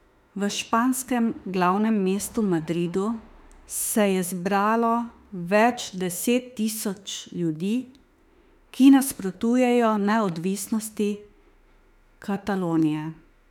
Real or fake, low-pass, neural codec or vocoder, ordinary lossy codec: fake; 19.8 kHz; autoencoder, 48 kHz, 32 numbers a frame, DAC-VAE, trained on Japanese speech; none